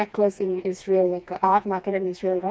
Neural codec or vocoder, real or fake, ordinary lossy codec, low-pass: codec, 16 kHz, 2 kbps, FreqCodec, smaller model; fake; none; none